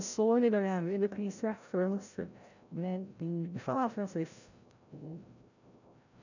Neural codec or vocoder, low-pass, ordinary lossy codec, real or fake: codec, 16 kHz, 0.5 kbps, FreqCodec, larger model; 7.2 kHz; none; fake